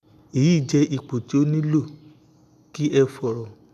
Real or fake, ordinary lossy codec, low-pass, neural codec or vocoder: real; none; 14.4 kHz; none